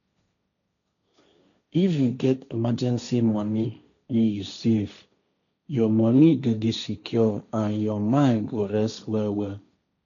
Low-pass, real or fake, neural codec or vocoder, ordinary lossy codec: 7.2 kHz; fake; codec, 16 kHz, 1.1 kbps, Voila-Tokenizer; none